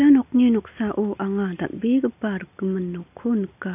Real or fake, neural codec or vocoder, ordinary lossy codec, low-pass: real; none; none; 3.6 kHz